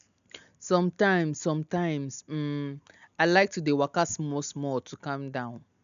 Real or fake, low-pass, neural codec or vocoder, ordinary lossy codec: real; 7.2 kHz; none; none